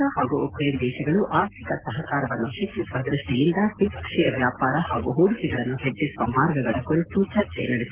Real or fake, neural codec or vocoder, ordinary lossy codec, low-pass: real; none; Opus, 16 kbps; 3.6 kHz